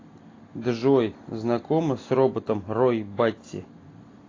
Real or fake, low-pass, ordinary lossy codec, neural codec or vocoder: real; 7.2 kHz; AAC, 32 kbps; none